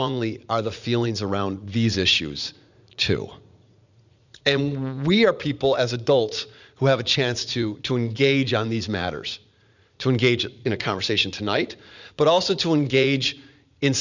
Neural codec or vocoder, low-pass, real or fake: vocoder, 44.1 kHz, 80 mel bands, Vocos; 7.2 kHz; fake